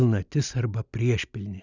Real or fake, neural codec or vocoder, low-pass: real; none; 7.2 kHz